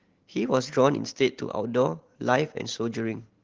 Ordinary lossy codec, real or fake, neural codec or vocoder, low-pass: Opus, 16 kbps; real; none; 7.2 kHz